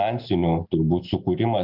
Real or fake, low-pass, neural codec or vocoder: real; 5.4 kHz; none